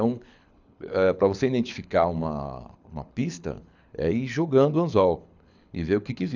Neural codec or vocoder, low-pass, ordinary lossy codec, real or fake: codec, 24 kHz, 6 kbps, HILCodec; 7.2 kHz; none; fake